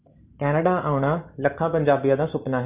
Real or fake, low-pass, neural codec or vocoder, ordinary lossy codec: real; 3.6 kHz; none; Opus, 64 kbps